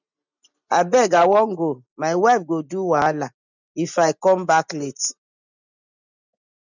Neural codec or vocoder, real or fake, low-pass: none; real; 7.2 kHz